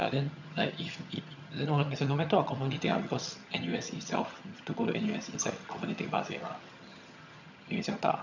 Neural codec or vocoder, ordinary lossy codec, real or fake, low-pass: vocoder, 22.05 kHz, 80 mel bands, HiFi-GAN; none; fake; 7.2 kHz